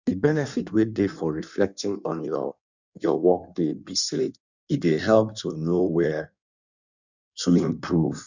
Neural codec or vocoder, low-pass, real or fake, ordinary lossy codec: codec, 16 kHz in and 24 kHz out, 1.1 kbps, FireRedTTS-2 codec; 7.2 kHz; fake; none